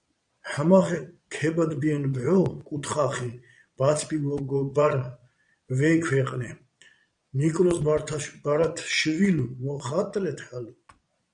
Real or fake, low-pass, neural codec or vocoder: fake; 9.9 kHz; vocoder, 22.05 kHz, 80 mel bands, Vocos